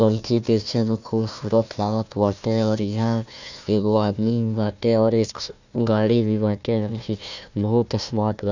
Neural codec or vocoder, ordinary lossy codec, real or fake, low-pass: codec, 16 kHz, 1 kbps, FunCodec, trained on Chinese and English, 50 frames a second; none; fake; 7.2 kHz